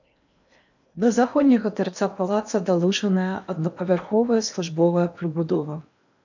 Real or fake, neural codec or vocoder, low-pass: fake; codec, 16 kHz in and 24 kHz out, 0.8 kbps, FocalCodec, streaming, 65536 codes; 7.2 kHz